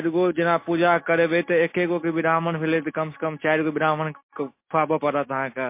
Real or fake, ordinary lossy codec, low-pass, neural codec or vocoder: real; MP3, 24 kbps; 3.6 kHz; none